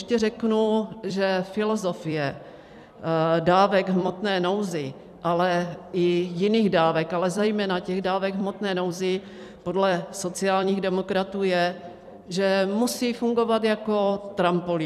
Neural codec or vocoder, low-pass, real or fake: vocoder, 44.1 kHz, 128 mel bands every 256 samples, BigVGAN v2; 14.4 kHz; fake